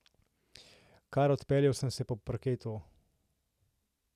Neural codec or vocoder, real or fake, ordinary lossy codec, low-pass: none; real; none; 14.4 kHz